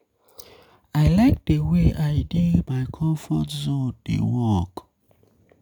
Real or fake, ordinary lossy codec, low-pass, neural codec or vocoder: real; none; 19.8 kHz; none